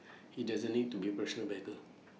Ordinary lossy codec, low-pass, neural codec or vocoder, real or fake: none; none; none; real